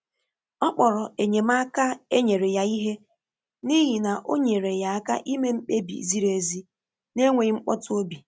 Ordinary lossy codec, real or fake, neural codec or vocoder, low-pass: none; real; none; none